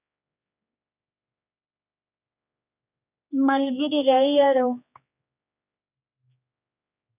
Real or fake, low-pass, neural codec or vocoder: fake; 3.6 kHz; codec, 16 kHz, 2 kbps, X-Codec, HuBERT features, trained on general audio